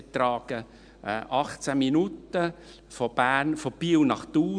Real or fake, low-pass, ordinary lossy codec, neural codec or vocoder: real; 9.9 kHz; none; none